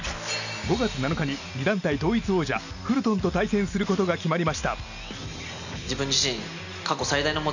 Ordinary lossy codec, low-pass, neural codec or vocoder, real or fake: none; 7.2 kHz; none; real